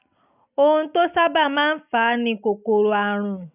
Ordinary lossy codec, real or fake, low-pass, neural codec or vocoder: none; real; 3.6 kHz; none